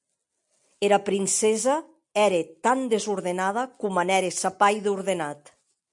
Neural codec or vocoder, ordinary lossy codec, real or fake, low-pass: none; MP3, 96 kbps; real; 10.8 kHz